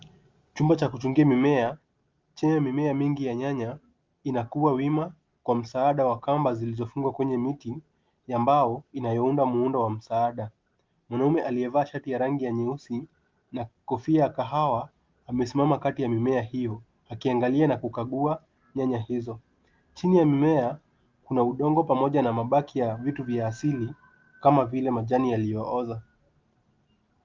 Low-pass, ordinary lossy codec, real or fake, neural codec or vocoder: 7.2 kHz; Opus, 32 kbps; real; none